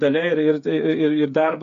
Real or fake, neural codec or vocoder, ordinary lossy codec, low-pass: fake; codec, 16 kHz, 8 kbps, FreqCodec, smaller model; AAC, 96 kbps; 7.2 kHz